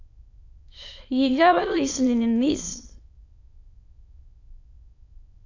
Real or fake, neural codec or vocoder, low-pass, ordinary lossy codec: fake; autoencoder, 22.05 kHz, a latent of 192 numbers a frame, VITS, trained on many speakers; 7.2 kHz; AAC, 48 kbps